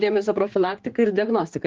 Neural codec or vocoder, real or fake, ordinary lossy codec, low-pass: codec, 16 kHz, 4 kbps, X-Codec, HuBERT features, trained on general audio; fake; Opus, 16 kbps; 7.2 kHz